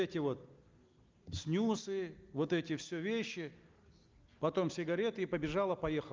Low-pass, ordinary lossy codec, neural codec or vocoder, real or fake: 7.2 kHz; Opus, 32 kbps; none; real